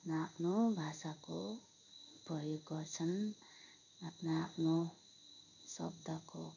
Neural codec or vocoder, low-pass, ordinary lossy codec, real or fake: codec, 16 kHz in and 24 kHz out, 1 kbps, XY-Tokenizer; 7.2 kHz; none; fake